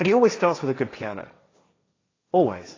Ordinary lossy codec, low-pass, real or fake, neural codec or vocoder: AAC, 32 kbps; 7.2 kHz; fake; codec, 16 kHz, 1.1 kbps, Voila-Tokenizer